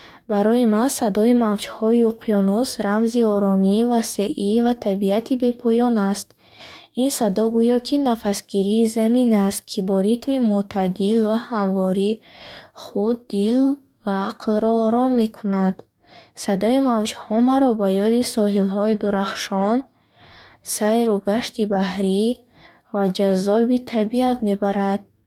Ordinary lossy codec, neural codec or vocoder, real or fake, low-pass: none; codec, 44.1 kHz, 2.6 kbps, DAC; fake; 19.8 kHz